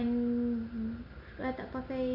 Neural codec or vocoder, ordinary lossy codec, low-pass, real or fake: none; MP3, 48 kbps; 5.4 kHz; real